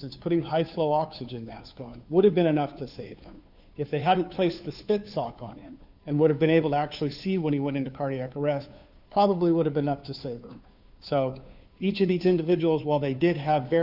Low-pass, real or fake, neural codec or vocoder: 5.4 kHz; fake; codec, 16 kHz, 2 kbps, FunCodec, trained on Chinese and English, 25 frames a second